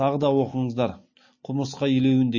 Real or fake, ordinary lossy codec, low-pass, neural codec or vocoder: real; MP3, 32 kbps; 7.2 kHz; none